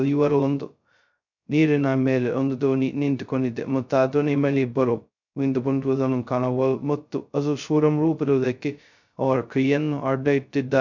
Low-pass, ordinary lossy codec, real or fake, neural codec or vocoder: 7.2 kHz; none; fake; codec, 16 kHz, 0.2 kbps, FocalCodec